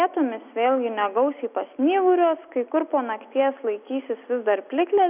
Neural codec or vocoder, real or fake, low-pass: none; real; 3.6 kHz